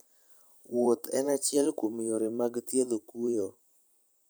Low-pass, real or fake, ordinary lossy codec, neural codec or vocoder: none; fake; none; vocoder, 44.1 kHz, 128 mel bands every 512 samples, BigVGAN v2